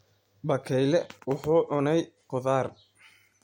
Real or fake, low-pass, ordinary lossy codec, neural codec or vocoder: real; 19.8 kHz; MP3, 64 kbps; none